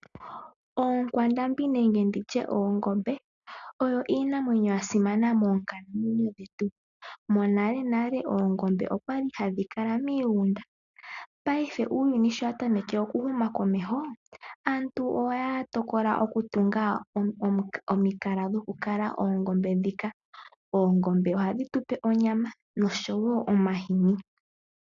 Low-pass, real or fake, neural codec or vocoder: 7.2 kHz; real; none